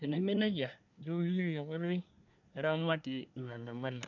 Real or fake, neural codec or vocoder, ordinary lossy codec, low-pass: fake; codec, 24 kHz, 1 kbps, SNAC; none; 7.2 kHz